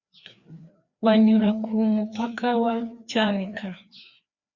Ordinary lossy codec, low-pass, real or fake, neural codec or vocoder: Opus, 64 kbps; 7.2 kHz; fake; codec, 16 kHz, 2 kbps, FreqCodec, larger model